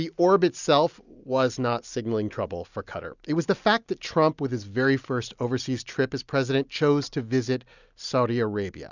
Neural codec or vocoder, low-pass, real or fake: none; 7.2 kHz; real